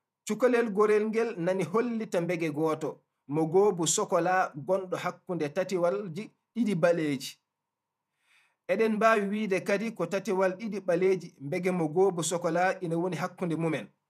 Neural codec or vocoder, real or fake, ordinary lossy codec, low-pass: vocoder, 48 kHz, 128 mel bands, Vocos; fake; none; 14.4 kHz